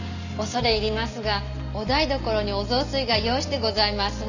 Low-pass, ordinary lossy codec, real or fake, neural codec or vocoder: 7.2 kHz; none; real; none